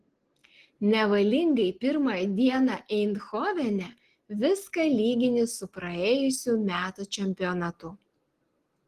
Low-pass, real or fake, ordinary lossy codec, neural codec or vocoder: 14.4 kHz; real; Opus, 16 kbps; none